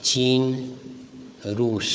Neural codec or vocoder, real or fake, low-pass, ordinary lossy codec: codec, 16 kHz, 4 kbps, FunCodec, trained on Chinese and English, 50 frames a second; fake; none; none